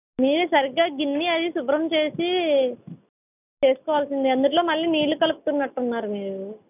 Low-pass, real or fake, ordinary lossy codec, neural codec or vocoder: 3.6 kHz; real; none; none